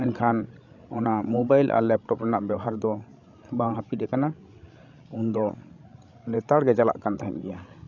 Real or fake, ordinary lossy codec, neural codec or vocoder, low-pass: fake; none; codec, 16 kHz, 16 kbps, FreqCodec, larger model; 7.2 kHz